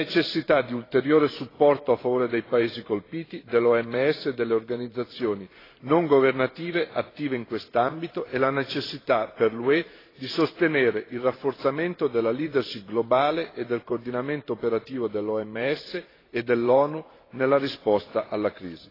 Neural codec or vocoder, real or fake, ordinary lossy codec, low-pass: vocoder, 44.1 kHz, 128 mel bands every 512 samples, BigVGAN v2; fake; AAC, 24 kbps; 5.4 kHz